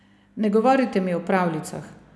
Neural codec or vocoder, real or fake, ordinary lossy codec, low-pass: none; real; none; none